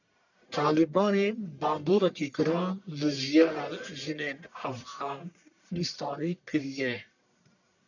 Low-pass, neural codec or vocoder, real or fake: 7.2 kHz; codec, 44.1 kHz, 1.7 kbps, Pupu-Codec; fake